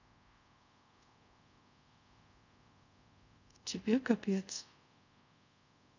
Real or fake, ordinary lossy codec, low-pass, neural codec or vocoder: fake; none; 7.2 kHz; codec, 24 kHz, 0.5 kbps, DualCodec